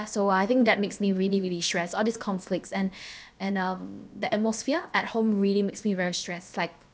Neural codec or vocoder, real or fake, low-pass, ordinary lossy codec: codec, 16 kHz, about 1 kbps, DyCAST, with the encoder's durations; fake; none; none